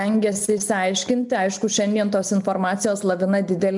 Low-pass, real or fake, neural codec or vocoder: 10.8 kHz; real; none